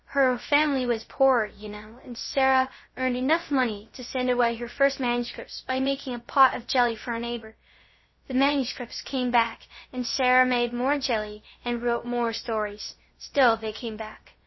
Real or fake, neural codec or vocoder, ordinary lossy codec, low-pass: fake; codec, 16 kHz, 0.3 kbps, FocalCodec; MP3, 24 kbps; 7.2 kHz